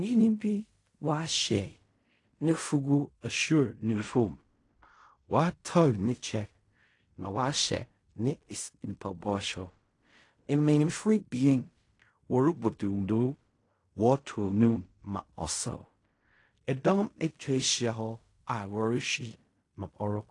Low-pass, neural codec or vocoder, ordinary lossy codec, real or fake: 10.8 kHz; codec, 16 kHz in and 24 kHz out, 0.4 kbps, LongCat-Audio-Codec, fine tuned four codebook decoder; AAC, 48 kbps; fake